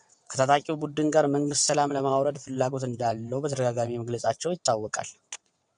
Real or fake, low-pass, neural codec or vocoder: fake; 9.9 kHz; vocoder, 22.05 kHz, 80 mel bands, WaveNeXt